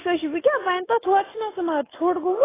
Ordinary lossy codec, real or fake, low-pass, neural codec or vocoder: AAC, 16 kbps; real; 3.6 kHz; none